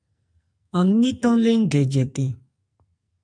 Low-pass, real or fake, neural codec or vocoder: 9.9 kHz; fake; codec, 44.1 kHz, 2.6 kbps, SNAC